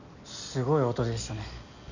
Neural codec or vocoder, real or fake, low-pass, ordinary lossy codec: none; real; 7.2 kHz; none